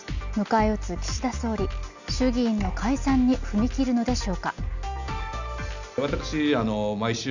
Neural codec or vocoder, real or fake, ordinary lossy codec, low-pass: none; real; none; 7.2 kHz